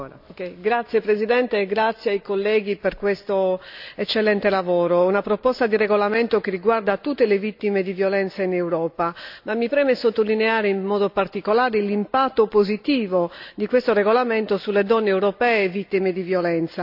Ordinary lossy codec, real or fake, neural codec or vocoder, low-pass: AAC, 48 kbps; real; none; 5.4 kHz